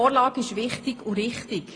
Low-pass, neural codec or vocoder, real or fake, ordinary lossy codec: 9.9 kHz; none; real; AAC, 32 kbps